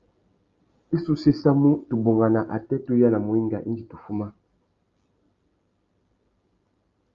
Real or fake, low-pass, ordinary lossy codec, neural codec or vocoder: real; 7.2 kHz; Opus, 32 kbps; none